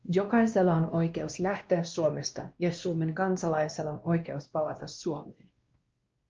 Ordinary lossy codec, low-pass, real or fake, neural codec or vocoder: Opus, 24 kbps; 7.2 kHz; fake; codec, 16 kHz, 1 kbps, X-Codec, WavLM features, trained on Multilingual LibriSpeech